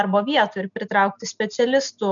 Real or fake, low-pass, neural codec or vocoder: real; 7.2 kHz; none